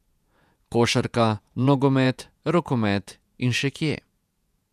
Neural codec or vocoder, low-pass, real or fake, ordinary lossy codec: vocoder, 48 kHz, 128 mel bands, Vocos; 14.4 kHz; fake; none